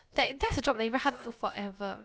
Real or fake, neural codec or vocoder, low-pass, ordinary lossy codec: fake; codec, 16 kHz, about 1 kbps, DyCAST, with the encoder's durations; none; none